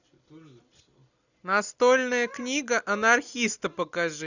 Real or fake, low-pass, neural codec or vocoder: real; 7.2 kHz; none